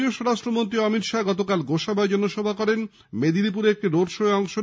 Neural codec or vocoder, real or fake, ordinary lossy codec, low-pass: none; real; none; none